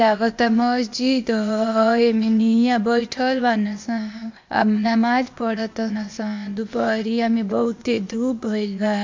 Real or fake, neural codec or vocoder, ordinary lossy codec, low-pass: fake; codec, 16 kHz, 0.8 kbps, ZipCodec; MP3, 48 kbps; 7.2 kHz